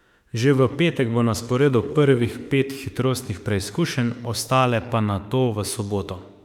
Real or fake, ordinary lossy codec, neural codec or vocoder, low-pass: fake; none; autoencoder, 48 kHz, 32 numbers a frame, DAC-VAE, trained on Japanese speech; 19.8 kHz